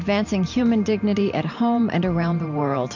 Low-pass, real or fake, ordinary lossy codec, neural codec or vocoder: 7.2 kHz; fake; MP3, 48 kbps; vocoder, 44.1 kHz, 128 mel bands every 512 samples, BigVGAN v2